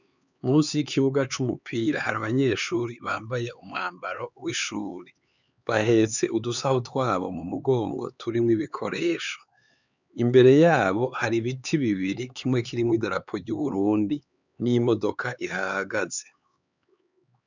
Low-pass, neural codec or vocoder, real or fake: 7.2 kHz; codec, 16 kHz, 4 kbps, X-Codec, HuBERT features, trained on LibriSpeech; fake